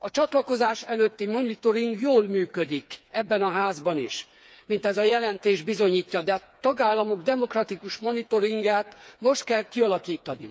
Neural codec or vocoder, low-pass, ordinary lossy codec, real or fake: codec, 16 kHz, 4 kbps, FreqCodec, smaller model; none; none; fake